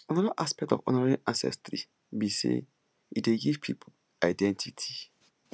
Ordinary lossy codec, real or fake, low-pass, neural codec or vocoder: none; real; none; none